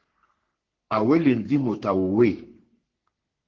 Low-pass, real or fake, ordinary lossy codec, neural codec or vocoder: 7.2 kHz; fake; Opus, 16 kbps; codec, 44.1 kHz, 3.4 kbps, Pupu-Codec